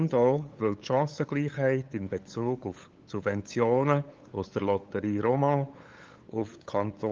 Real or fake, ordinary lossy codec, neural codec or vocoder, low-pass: fake; Opus, 32 kbps; codec, 16 kHz, 8 kbps, FunCodec, trained on LibriTTS, 25 frames a second; 7.2 kHz